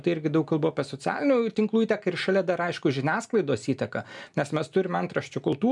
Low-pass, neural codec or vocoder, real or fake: 10.8 kHz; none; real